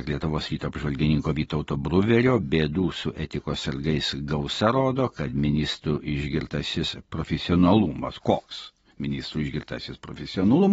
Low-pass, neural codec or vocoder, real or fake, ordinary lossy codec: 10.8 kHz; none; real; AAC, 24 kbps